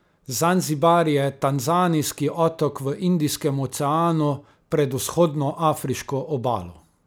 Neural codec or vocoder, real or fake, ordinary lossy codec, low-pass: none; real; none; none